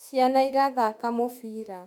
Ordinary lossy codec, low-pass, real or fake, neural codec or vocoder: none; 19.8 kHz; fake; autoencoder, 48 kHz, 32 numbers a frame, DAC-VAE, trained on Japanese speech